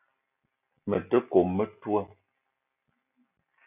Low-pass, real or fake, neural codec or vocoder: 3.6 kHz; real; none